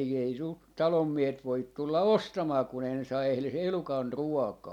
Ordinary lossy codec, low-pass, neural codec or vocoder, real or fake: none; 19.8 kHz; none; real